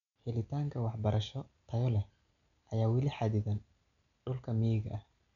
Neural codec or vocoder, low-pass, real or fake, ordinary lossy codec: none; 7.2 kHz; real; none